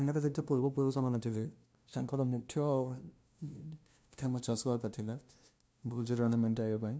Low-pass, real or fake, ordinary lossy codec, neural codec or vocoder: none; fake; none; codec, 16 kHz, 0.5 kbps, FunCodec, trained on LibriTTS, 25 frames a second